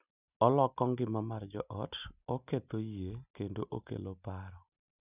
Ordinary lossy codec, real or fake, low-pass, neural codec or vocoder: none; real; 3.6 kHz; none